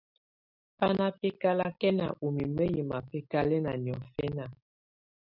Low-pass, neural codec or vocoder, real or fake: 5.4 kHz; none; real